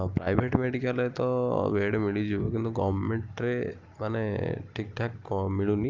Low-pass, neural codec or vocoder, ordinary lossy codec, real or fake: 7.2 kHz; none; Opus, 24 kbps; real